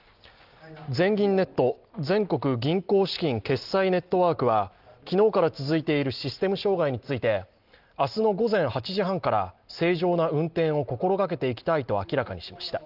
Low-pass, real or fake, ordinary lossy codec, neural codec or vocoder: 5.4 kHz; real; Opus, 32 kbps; none